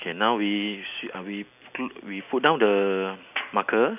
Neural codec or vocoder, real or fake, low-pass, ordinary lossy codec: none; real; 3.6 kHz; none